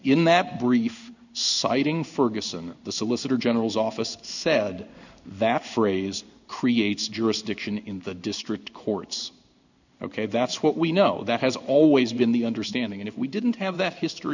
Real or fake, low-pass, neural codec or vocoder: fake; 7.2 kHz; codec, 16 kHz in and 24 kHz out, 1 kbps, XY-Tokenizer